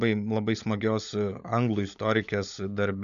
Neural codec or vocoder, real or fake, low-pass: codec, 16 kHz, 16 kbps, FunCodec, trained on LibriTTS, 50 frames a second; fake; 7.2 kHz